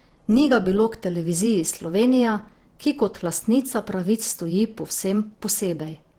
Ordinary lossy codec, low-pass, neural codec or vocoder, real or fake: Opus, 16 kbps; 19.8 kHz; vocoder, 48 kHz, 128 mel bands, Vocos; fake